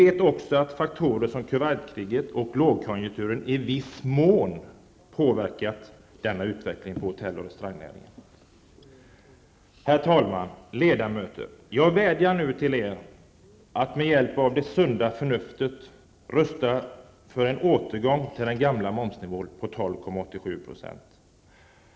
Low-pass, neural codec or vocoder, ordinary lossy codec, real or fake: none; none; none; real